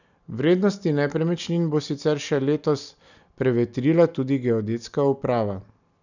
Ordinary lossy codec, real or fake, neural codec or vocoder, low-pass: none; real; none; 7.2 kHz